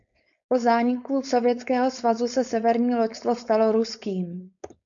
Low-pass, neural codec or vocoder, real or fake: 7.2 kHz; codec, 16 kHz, 4.8 kbps, FACodec; fake